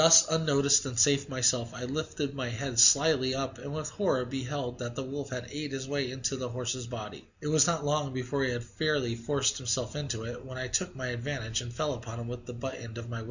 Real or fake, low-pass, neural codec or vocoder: real; 7.2 kHz; none